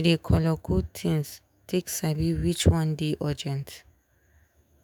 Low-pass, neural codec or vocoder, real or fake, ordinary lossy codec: none; autoencoder, 48 kHz, 128 numbers a frame, DAC-VAE, trained on Japanese speech; fake; none